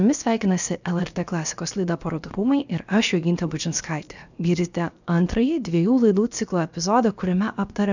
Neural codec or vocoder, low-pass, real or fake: codec, 16 kHz, 0.7 kbps, FocalCodec; 7.2 kHz; fake